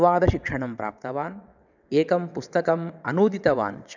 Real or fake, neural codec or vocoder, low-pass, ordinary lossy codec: fake; vocoder, 22.05 kHz, 80 mel bands, WaveNeXt; 7.2 kHz; none